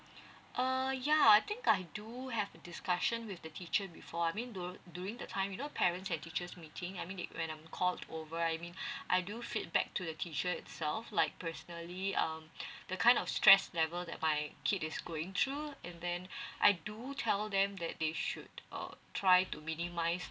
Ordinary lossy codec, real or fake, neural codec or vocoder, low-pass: none; real; none; none